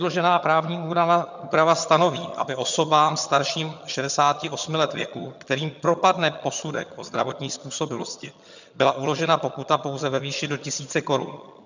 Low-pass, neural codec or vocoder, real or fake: 7.2 kHz; vocoder, 22.05 kHz, 80 mel bands, HiFi-GAN; fake